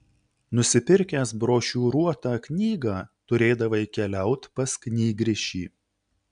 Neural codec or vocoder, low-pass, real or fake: none; 9.9 kHz; real